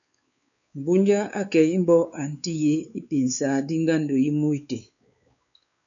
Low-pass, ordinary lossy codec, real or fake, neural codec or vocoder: 7.2 kHz; MP3, 96 kbps; fake; codec, 16 kHz, 4 kbps, X-Codec, WavLM features, trained on Multilingual LibriSpeech